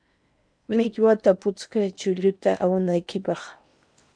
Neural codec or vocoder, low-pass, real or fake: codec, 16 kHz in and 24 kHz out, 0.8 kbps, FocalCodec, streaming, 65536 codes; 9.9 kHz; fake